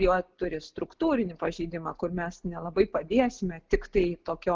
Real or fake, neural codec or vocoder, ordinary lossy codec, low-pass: real; none; Opus, 16 kbps; 7.2 kHz